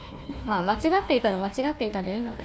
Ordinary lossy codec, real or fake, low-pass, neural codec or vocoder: none; fake; none; codec, 16 kHz, 1 kbps, FunCodec, trained on Chinese and English, 50 frames a second